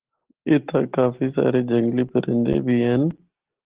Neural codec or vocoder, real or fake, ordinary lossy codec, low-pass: none; real; Opus, 16 kbps; 3.6 kHz